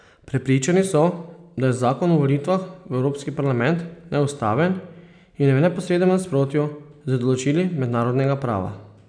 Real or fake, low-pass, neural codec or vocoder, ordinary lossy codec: real; 9.9 kHz; none; none